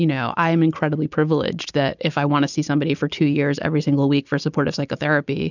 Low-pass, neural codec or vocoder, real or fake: 7.2 kHz; none; real